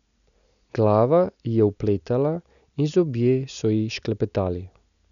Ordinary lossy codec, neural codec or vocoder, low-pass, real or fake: none; none; 7.2 kHz; real